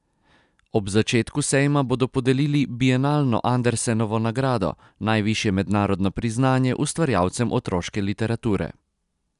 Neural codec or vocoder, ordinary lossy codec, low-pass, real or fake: none; none; 10.8 kHz; real